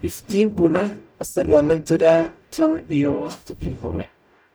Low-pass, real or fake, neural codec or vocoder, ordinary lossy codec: none; fake; codec, 44.1 kHz, 0.9 kbps, DAC; none